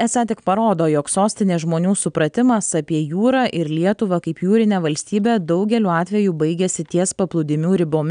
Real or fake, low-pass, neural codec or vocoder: real; 9.9 kHz; none